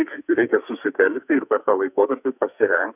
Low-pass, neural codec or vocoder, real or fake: 3.6 kHz; codec, 44.1 kHz, 2.6 kbps, SNAC; fake